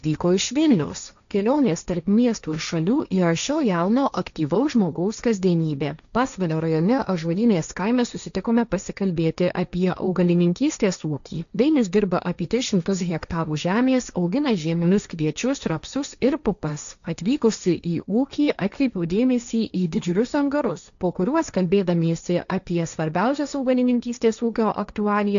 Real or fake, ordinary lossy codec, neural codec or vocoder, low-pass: fake; MP3, 96 kbps; codec, 16 kHz, 1.1 kbps, Voila-Tokenizer; 7.2 kHz